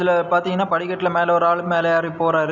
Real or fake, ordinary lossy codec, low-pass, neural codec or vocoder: real; none; 7.2 kHz; none